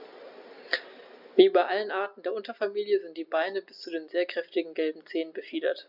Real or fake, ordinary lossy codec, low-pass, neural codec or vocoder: real; none; 5.4 kHz; none